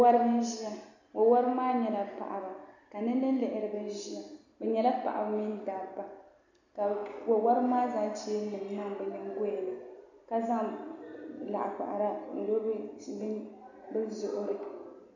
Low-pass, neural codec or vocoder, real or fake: 7.2 kHz; none; real